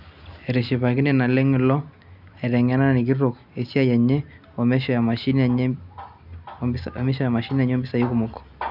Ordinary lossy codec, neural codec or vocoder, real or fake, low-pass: none; none; real; 5.4 kHz